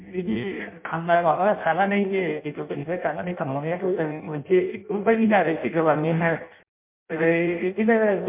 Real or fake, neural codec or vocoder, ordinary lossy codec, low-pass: fake; codec, 16 kHz in and 24 kHz out, 0.6 kbps, FireRedTTS-2 codec; MP3, 32 kbps; 3.6 kHz